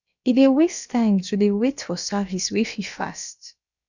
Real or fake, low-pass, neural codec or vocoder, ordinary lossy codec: fake; 7.2 kHz; codec, 16 kHz, about 1 kbps, DyCAST, with the encoder's durations; none